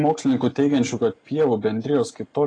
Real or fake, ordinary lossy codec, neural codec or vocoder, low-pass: real; AAC, 32 kbps; none; 9.9 kHz